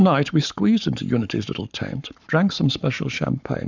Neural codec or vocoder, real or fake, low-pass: codec, 44.1 kHz, 7.8 kbps, Pupu-Codec; fake; 7.2 kHz